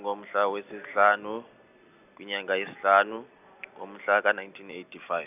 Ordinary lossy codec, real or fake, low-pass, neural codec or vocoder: none; real; 3.6 kHz; none